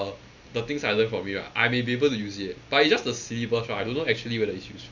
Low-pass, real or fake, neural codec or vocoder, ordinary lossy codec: 7.2 kHz; real; none; none